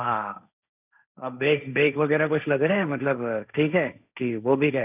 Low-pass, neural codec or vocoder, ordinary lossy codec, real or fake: 3.6 kHz; codec, 16 kHz, 1.1 kbps, Voila-Tokenizer; none; fake